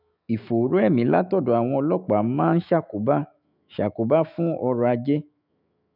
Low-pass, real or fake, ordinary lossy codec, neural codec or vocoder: 5.4 kHz; fake; none; autoencoder, 48 kHz, 128 numbers a frame, DAC-VAE, trained on Japanese speech